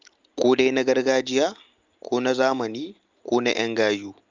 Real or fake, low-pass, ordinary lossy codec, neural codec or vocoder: real; 7.2 kHz; Opus, 24 kbps; none